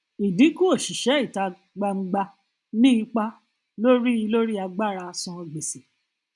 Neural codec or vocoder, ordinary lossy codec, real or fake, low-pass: none; none; real; 10.8 kHz